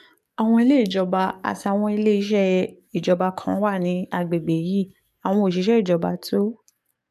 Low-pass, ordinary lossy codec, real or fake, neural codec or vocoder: 14.4 kHz; MP3, 96 kbps; fake; codec, 44.1 kHz, 7.8 kbps, DAC